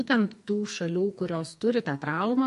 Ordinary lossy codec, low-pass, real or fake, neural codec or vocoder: MP3, 48 kbps; 14.4 kHz; fake; codec, 44.1 kHz, 2.6 kbps, SNAC